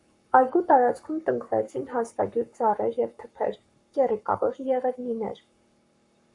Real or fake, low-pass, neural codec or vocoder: fake; 10.8 kHz; codec, 44.1 kHz, 7.8 kbps, Pupu-Codec